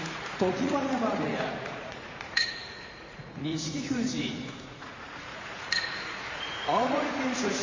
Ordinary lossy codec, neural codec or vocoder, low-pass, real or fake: MP3, 32 kbps; vocoder, 44.1 kHz, 80 mel bands, Vocos; 7.2 kHz; fake